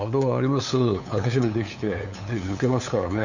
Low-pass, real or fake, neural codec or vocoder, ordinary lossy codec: 7.2 kHz; fake; codec, 16 kHz, 8 kbps, FunCodec, trained on LibriTTS, 25 frames a second; none